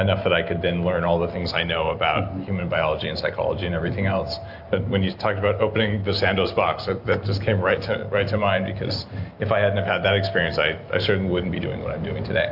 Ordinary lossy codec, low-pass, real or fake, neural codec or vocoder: AAC, 48 kbps; 5.4 kHz; real; none